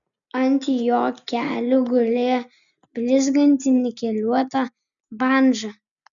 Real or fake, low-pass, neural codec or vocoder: real; 7.2 kHz; none